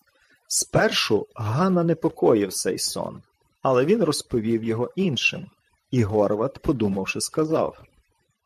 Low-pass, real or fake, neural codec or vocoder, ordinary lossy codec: 14.4 kHz; fake; vocoder, 44.1 kHz, 128 mel bands, Pupu-Vocoder; MP3, 64 kbps